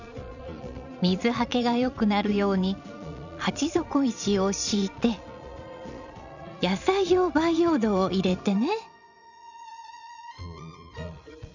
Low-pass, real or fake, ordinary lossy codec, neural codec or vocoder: 7.2 kHz; fake; none; vocoder, 22.05 kHz, 80 mel bands, Vocos